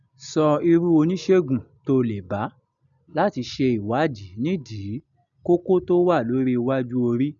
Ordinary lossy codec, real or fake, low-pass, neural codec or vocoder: none; real; 7.2 kHz; none